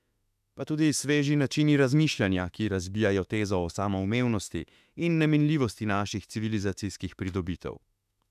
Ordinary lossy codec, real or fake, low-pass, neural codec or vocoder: none; fake; 14.4 kHz; autoencoder, 48 kHz, 32 numbers a frame, DAC-VAE, trained on Japanese speech